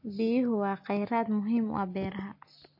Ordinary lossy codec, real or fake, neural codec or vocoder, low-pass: MP3, 32 kbps; real; none; 5.4 kHz